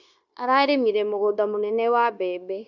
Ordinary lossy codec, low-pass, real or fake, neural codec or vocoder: none; 7.2 kHz; fake; codec, 16 kHz, 0.9 kbps, LongCat-Audio-Codec